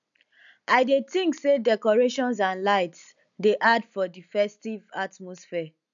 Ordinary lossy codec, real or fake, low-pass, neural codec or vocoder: none; real; 7.2 kHz; none